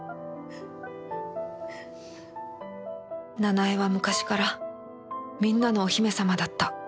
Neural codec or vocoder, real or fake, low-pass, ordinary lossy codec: none; real; none; none